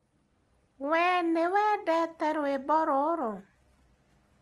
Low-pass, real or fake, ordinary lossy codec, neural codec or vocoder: 10.8 kHz; real; Opus, 24 kbps; none